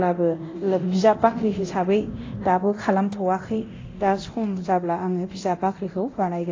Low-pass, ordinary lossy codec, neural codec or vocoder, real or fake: 7.2 kHz; AAC, 32 kbps; codec, 24 kHz, 0.9 kbps, DualCodec; fake